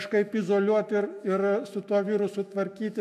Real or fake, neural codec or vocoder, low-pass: fake; autoencoder, 48 kHz, 128 numbers a frame, DAC-VAE, trained on Japanese speech; 14.4 kHz